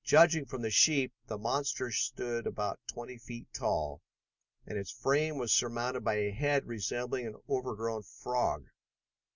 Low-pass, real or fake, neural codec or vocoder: 7.2 kHz; real; none